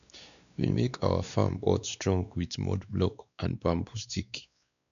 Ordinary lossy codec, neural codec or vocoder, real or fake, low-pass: none; codec, 16 kHz, 2 kbps, X-Codec, WavLM features, trained on Multilingual LibriSpeech; fake; 7.2 kHz